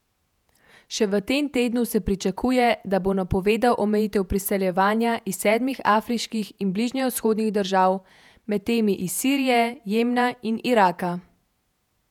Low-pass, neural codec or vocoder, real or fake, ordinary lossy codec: 19.8 kHz; vocoder, 48 kHz, 128 mel bands, Vocos; fake; none